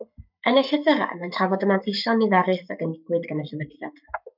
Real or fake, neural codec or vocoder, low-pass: fake; codec, 44.1 kHz, 7.8 kbps, Pupu-Codec; 5.4 kHz